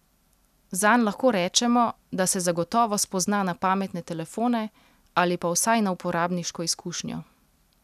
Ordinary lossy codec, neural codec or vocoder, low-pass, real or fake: none; none; 14.4 kHz; real